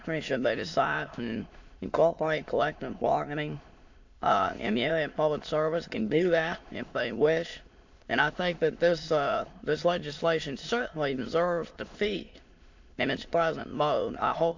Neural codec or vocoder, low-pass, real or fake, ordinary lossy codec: autoencoder, 22.05 kHz, a latent of 192 numbers a frame, VITS, trained on many speakers; 7.2 kHz; fake; AAC, 48 kbps